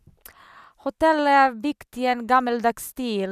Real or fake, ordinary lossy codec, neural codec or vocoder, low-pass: real; none; none; 14.4 kHz